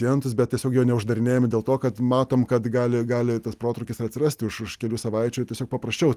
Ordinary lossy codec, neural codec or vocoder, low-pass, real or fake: Opus, 32 kbps; none; 14.4 kHz; real